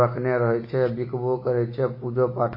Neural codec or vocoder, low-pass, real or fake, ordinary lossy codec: none; 5.4 kHz; real; MP3, 24 kbps